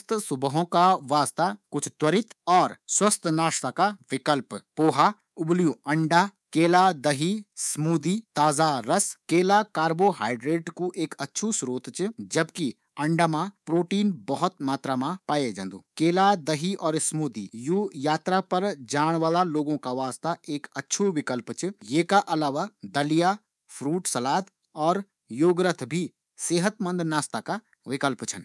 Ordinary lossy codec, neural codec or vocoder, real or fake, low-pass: none; codec, 24 kHz, 3.1 kbps, DualCodec; fake; none